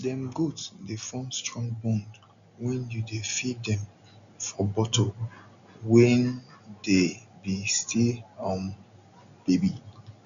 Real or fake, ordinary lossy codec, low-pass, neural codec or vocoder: real; none; 7.2 kHz; none